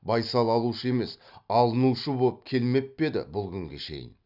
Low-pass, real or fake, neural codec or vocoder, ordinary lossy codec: 5.4 kHz; real; none; none